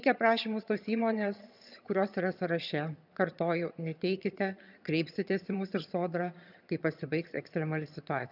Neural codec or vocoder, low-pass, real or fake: vocoder, 22.05 kHz, 80 mel bands, HiFi-GAN; 5.4 kHz; fake